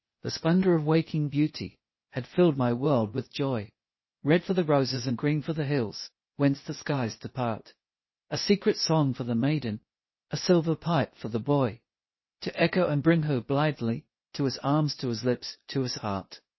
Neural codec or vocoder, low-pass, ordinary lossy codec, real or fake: codec, 16 kHz, 0.8 kbps, ZipCodec; 7.2 kHz; MP3, 24 kbps; fake